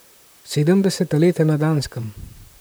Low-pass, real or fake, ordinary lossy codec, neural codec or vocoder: none; fake; none; vocoder, 44.1 kHz, 128 mel bands, Pupu-Vocoder